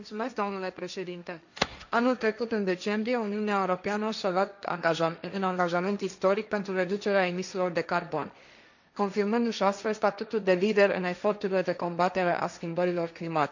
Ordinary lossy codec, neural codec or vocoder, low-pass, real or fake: none; codec, 16 kHz, 1.1 kbps, Voila-Tokenizer; 7.2 kHz; fake